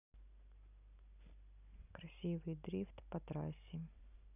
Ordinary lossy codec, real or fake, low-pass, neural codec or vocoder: none; real; 3.6 kHz; none